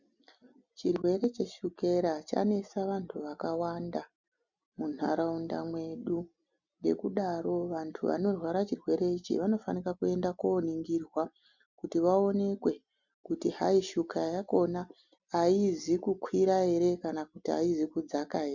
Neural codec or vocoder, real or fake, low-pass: none; real; 7.2 kHz